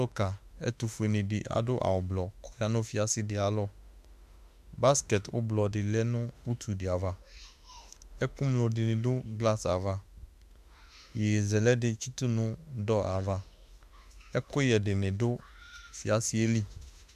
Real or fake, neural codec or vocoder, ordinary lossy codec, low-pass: fake; autoencoder, 48 kHz, 32 numbers a frame, DAC-VAE, trained on Japanese speech; MP3, 96 kbps; 14.4 kHz